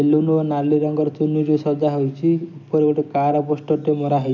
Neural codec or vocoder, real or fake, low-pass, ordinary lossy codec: none; real; 7.2 kHz; none